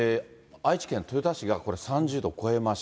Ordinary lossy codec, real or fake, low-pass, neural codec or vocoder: none; real; none; none